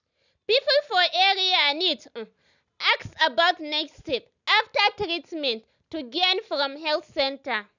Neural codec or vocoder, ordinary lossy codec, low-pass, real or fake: none; none; 7.2 kHz; real